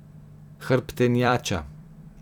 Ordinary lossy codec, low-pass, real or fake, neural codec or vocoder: none; 19.8 kHz; fake; vocoder, 44.1 kHz, 128 mel bands every 256 samples, BigVGAN v2